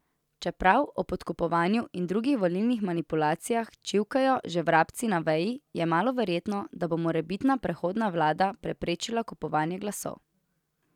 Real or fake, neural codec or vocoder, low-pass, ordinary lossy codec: real; none; 19.8 kHz; none